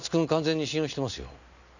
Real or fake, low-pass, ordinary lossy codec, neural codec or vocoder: real; 7.2 kHz; none; none